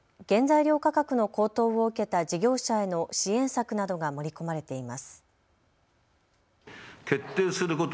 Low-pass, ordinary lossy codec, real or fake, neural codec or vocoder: none; none; real; none